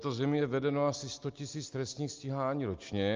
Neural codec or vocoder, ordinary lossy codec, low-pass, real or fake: none; Opus, 24 kbps; 7.2 kHz; real